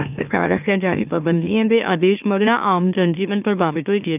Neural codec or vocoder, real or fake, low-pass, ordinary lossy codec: autoencoder, 44.1 kHz, a latent of 192 numbers a frame, MeloTTS; fake; 3.6 kHz; none